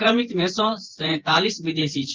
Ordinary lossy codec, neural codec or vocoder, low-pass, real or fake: Opus, 16 kbps; vocoder, 24 kHz, 100 mel bands, Vocos; 7.2 kHz; fake